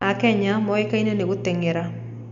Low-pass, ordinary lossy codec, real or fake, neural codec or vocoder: 7.2 kHz; none; real; none